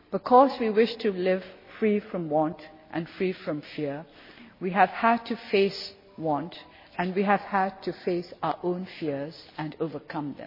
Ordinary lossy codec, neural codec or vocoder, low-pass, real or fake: MP3, 24 kbps; none; 5.4 kHz; real